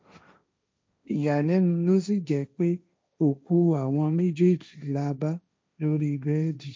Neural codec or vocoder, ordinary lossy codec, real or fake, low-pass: codec, 16 kHz, 1.1 kbps, Voila-Tokenizer; none; fake; none